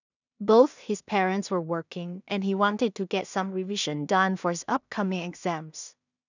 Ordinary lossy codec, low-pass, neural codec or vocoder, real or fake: none; 7.2 kHz; codec, 16 kHz in and 24 kHz out, 0.4 kbps, LongCat-Audio-Codec, two codebook decoder; fake